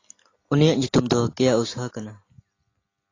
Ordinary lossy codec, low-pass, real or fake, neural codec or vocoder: AAC, 32 kbps; 7.2 kHz; real; none